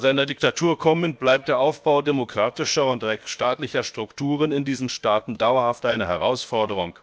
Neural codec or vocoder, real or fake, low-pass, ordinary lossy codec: codec, 16 kHz, about 1 kbps, DyCAST, with the encoder's durations; fake; none; none